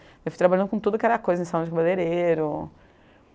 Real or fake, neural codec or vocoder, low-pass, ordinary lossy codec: real; none; none; none